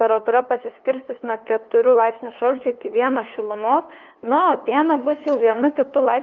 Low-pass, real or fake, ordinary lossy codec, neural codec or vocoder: 7.2 kHz; fake; Opus, 32 kbps; codec, 16 kHz, 2 kbps, FunCodec, trained on LibriTTS, 25 frames a second